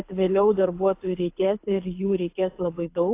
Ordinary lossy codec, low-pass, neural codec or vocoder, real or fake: AAC, 24 kbps; 3.6 kHz; codec, 24 kHz, 6 kbps, HILCodec; fake